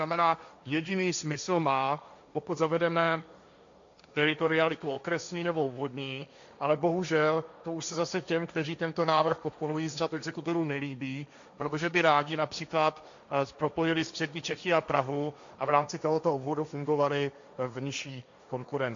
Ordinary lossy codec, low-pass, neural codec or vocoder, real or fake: MP3, 64 kbps; 7.2 kHz; codec, 16 kHz, 1.1 kbps, Voila-Tokenizer; fake